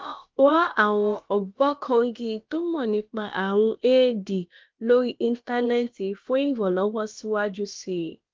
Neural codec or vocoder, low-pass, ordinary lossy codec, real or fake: codec, 16 kHz, about 1 kbps, DyCAST, with the encoder's durations; 7.2 kHz; Opus, 32 kbps; fake